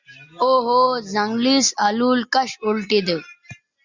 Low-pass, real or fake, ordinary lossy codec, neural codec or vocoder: 7.2 kHz; real; Opus, 64 kbps; none